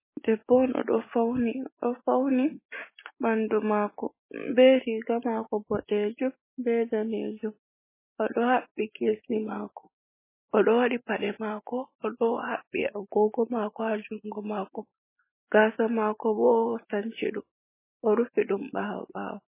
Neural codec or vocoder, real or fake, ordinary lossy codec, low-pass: none; real; MP3, 16 kbps; 3.6 kHz